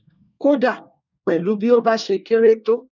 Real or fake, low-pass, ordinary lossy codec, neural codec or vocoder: fake; 7.2 kHz; none; codec, 24 kHz, 1 kbps, SNAC